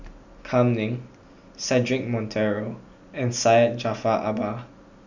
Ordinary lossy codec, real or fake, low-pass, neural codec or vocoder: none; real; 7.2 kHz; none